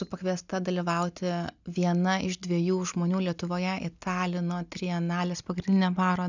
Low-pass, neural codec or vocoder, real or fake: 7.2 kHz; none; real